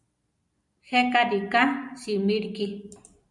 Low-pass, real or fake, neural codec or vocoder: 10.8 kHz; real; none